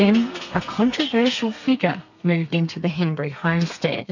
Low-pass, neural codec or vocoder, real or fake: 7.2 kHz; codec, 32 kHz, 1.9 kbps, SNAC; fake